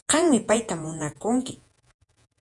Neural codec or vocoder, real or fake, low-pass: vocoder, 48 kHz, 128 mel bands, Vocos; fake; 10.8 kHz